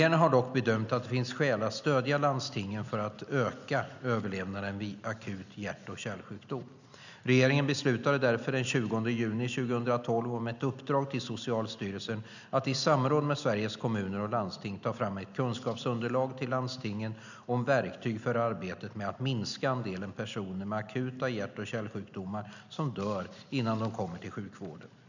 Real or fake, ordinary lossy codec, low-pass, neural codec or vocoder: real; none; 7.2 kHz; none